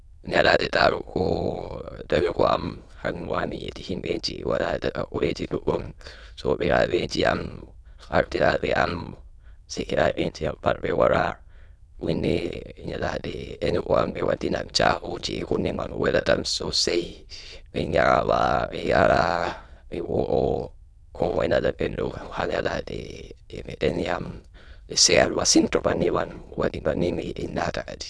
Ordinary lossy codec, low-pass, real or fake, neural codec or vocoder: none; none; fake; autoencoder, 22.05 kHz, a latent of 192 numbers a frame, VITS, trained on many speakers